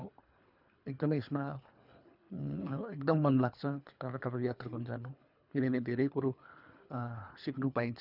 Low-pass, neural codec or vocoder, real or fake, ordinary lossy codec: 5.4 kHz; codec, 24 kHz, 3 kbps, HILCodec; fake; none